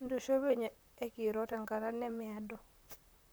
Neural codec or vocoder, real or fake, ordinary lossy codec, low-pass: vocoder, 44.1 kHz, 128 mel bands, Pupu-Vocoder; fake; none; none